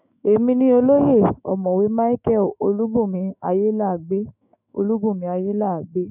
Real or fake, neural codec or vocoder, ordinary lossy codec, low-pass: fake; autoencoder, 48 kHz, 128 numbers a frame, DAC-VAE, trained on Japanese speech; none; 3.6 kHz